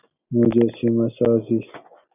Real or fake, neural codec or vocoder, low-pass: real; none; 3.6 kHz